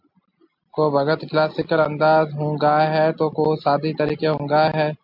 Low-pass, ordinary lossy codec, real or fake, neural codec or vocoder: 5.4 kHz; MP3, 48 kbps; real; none